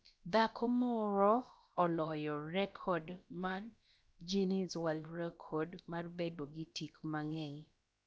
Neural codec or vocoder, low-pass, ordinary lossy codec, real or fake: codec, 16 kHz, about 1 kbps, DyCAST, with the encoder's durations; none; none; fake